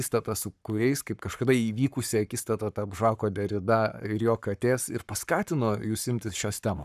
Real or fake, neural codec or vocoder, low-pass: fake; codec, 44.1 kHz, 7.8 kbps, Pupu-Codec; 14.4 kHz